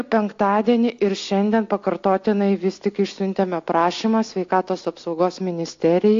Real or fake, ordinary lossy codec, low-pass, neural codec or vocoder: real; AAC, 48 kbps; 7.2 kHz; none